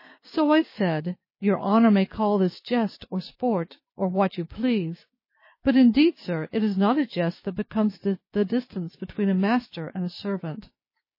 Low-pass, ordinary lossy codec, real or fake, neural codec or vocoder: 5.4 kHz; MP3, 24 kbps; fake; codec, 16 kHz, 6 kbps, DAC